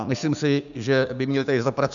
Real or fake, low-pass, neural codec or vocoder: fake; 7.2 kHz; codec, 16 kHz, 6 kbps, DAC